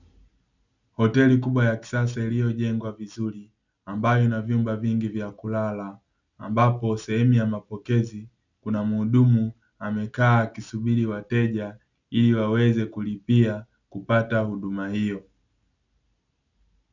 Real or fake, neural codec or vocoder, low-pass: real; none; 7.2 kHz